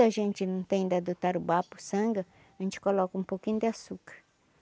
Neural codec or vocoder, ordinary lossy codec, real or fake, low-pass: none; none; real; none